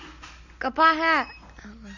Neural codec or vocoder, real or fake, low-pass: none; real; 7.2 kHz